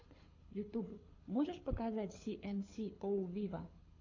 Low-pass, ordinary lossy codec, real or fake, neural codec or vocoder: 7.2 kHz; AAC, 48 kbps; fake; codec, 24 kHz, 6 kbps, HILCodec